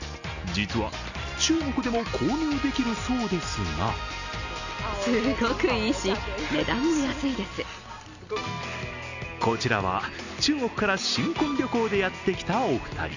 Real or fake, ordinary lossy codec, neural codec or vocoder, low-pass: real; none; none; 7.2 kHz